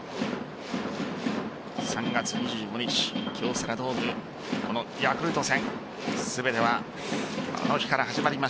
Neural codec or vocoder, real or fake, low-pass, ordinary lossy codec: none; real; none; none